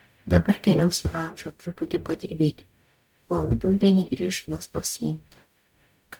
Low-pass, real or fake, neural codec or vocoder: 19.8 kHz; fake; codec, 44.1 kHz, 0.9 kbps, DAC